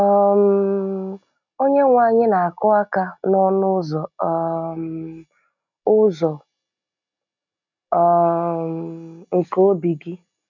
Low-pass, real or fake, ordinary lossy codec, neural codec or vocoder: 7.2 kHz; real; none; none